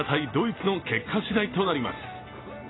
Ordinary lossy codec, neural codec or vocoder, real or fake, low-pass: AAC, 16 kbps; none; real; 7.2 kHz